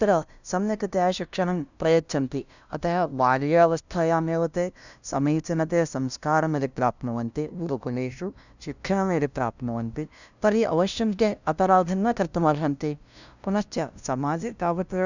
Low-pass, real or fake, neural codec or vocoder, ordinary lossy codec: 7.2 kHz; fake; codec, 16 kHz, 0.5 kbps, FunCodec, trained on LibriTTS, 25 frames a second; none